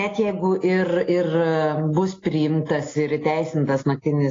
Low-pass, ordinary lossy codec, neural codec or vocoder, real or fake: 7.2 kHz; AAC, 32 kbps; none; real